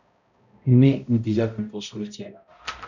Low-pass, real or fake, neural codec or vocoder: 7.2 kHz; fake; codec, 16 kHz, 0.5 kbps, X-Codec, HuBERT features, trained on balanced general audio